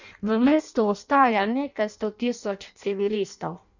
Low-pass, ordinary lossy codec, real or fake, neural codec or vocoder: 7.2 kHz; none; fake; codec, 16 kHz in and 24 kHz out, 0.6 kbps, FireRedTTS-2 codec